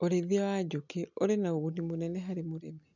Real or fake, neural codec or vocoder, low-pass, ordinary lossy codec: real; none; 7.2 kHz; none